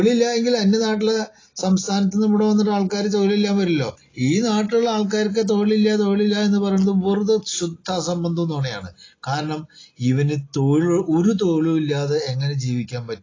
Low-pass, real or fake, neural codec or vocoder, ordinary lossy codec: 7.2 kHz; real; none; AAC, 32 kbps